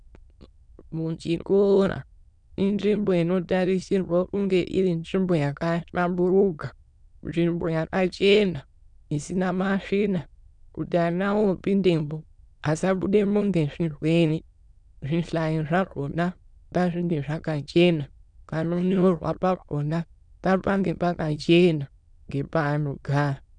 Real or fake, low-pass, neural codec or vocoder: fake; 9.9 kHz; autoencoder, 22.05 kHz, a latent of 192 numbers a frame, VITS, trained on many speakers